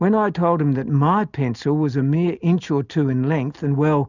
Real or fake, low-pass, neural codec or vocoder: real; 7.2 kHz; none